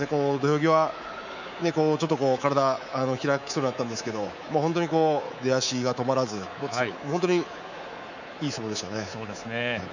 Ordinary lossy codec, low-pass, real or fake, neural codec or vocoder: none; 7.2 kHz; fake; codec, 24 kHz, 3.1 kbps, DualCodec